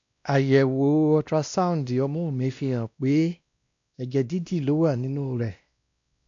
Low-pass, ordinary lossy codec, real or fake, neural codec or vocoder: 7.2 kHz; AAC, 64 kbps; fake; codec, 16 kHz, 1 kbps, X-Codec, WavLM features, trained on Multilingual LibriSpeech